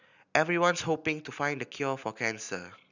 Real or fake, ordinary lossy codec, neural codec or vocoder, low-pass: real; none; none; 7.2 kHz